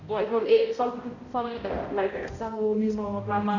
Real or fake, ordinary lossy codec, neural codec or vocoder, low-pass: fake; none; codec, 16 kHz, 0.5 kbps, X-Codec, HuBERT features, trained on balanced general audio; 7.2 kHz